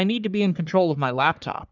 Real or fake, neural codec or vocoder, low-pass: fake; codec, 44.1 kHz, 3.4 kbps, Pupu-Codec; 7.2 kHz